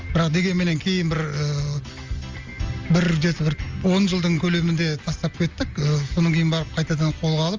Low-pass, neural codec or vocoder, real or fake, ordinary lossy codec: 7.2 kHz; none; real; Opus, 32 kbps